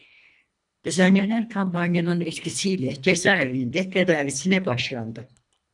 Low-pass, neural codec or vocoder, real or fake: 10.8 kHz; codec, 24 kHz, 1.5 kbps, HILCodec; fake